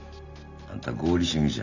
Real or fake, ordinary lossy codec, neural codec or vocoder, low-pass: real; none; none; 7.2 kHz